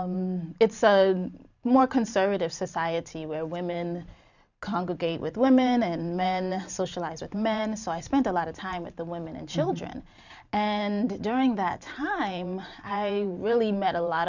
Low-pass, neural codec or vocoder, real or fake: 7.2 kHz; vocoder, 44.1 kHz, 128 mel bands every 512 samples, BigVGAN v2; fake